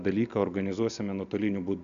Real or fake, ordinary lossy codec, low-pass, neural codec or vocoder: real; AAC, 96 kbps; 7.2 kHz; none